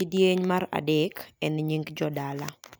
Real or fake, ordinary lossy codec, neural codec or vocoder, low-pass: real; none; none; none